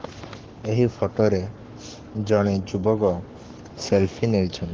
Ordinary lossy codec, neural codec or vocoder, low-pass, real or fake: Opus, 16 kbps; codec, 44.1 kHz, 7.8 kbps, Pupu-Codec; 7.2 kHz; fake